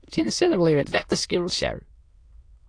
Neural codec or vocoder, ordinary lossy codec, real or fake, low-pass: autoencoder, 22.05 kHz, a latent of 192 numbers a frame, VITS, trained on many speakers; AAC, 48 kbps; fake; 9.9 kHz